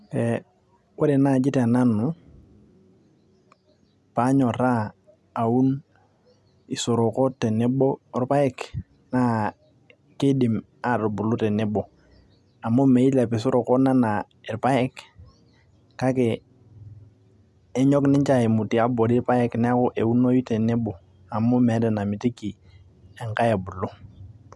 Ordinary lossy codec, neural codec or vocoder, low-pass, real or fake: none; none; none; real